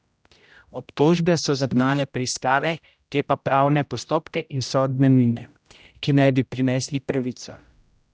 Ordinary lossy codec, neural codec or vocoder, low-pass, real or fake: none; codec, 16 kHz, 0.5 kbps, X-Codec, HuBERT features, trained on general audio; none; fake